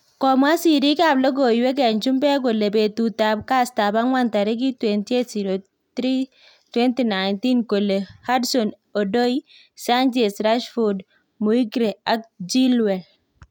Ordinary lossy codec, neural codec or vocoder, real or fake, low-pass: none; none; real; 19.8 kHz